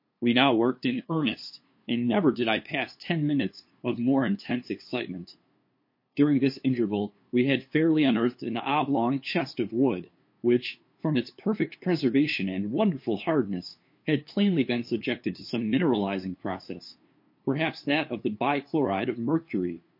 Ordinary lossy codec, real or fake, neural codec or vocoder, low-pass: MP3, 32 kbps; fake; codec, 16 kHz, 2 kbps, FunCodec, trained on LibriTTS, 25 frames a second; 5.4 kHz